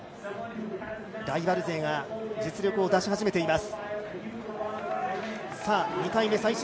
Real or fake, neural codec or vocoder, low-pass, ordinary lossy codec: real; none; none; none